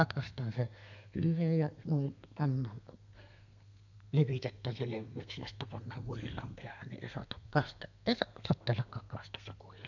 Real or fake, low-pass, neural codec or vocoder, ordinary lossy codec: fake; 7.2 kHz; codec, 32 kHz, 1.9 kbps, SNAC; none